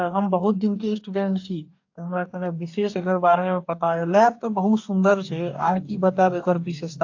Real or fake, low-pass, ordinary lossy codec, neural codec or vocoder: fake; 7.2 kHz; AAC, 48 kbps; codec, 44.1 kHz, 2.6 kbps, DAC